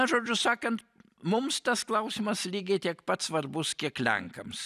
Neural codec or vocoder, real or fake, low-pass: none; real; 14.4 kHz